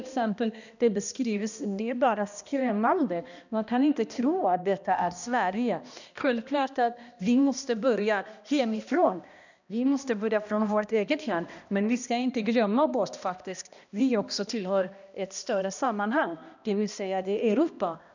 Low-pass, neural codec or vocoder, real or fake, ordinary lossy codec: 7.2 kHz; codec, 16 kHz, 1 kbps, X-Codec, HuBERT features, trained on balanced general audio; fake; none